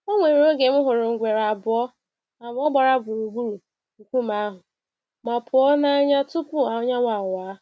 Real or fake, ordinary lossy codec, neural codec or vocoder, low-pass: real; none; none; none